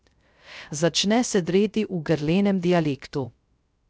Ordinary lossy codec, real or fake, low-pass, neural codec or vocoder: none; fake; none; codec, 16 kHz, 0.3 kbps, FocalCodec